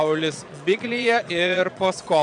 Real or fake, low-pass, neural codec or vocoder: fake; 9.9 kHz; vocoder, 22.05 kHz, 80 mel bands, Vocos